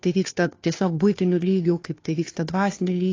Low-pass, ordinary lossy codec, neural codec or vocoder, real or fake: 7.2 kHz; AAC, 32 kbps; codec, 16 kHz, 2 kbps, FunCodec, trained on Chinese and English, 25 frames a second; fake